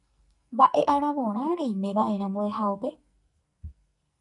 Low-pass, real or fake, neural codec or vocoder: 10.8 kHz; fake; codec, 44.1 kHz, 2.6 kbps, SNAC